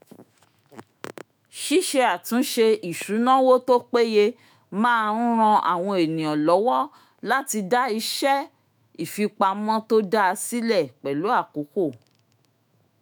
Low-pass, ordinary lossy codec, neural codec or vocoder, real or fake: none; none; autoencoder, 48 kHz, 128 numbers a frame, DAC-VAE, trained on Japanese speech; fake